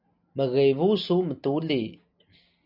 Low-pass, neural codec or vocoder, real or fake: 5.4 kHz; none; real